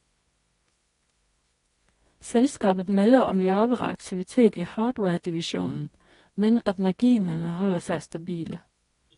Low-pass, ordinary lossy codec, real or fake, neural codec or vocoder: 10.8 kHz; AAC, 48 kbps; fake; codec, 24 kHz, 0.9 kbps, WavTokenizer, medium music audio release